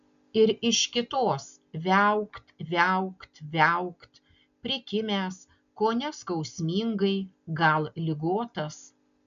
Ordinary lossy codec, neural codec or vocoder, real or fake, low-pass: MP3, 96 kbps; none; real; 7.2 kHz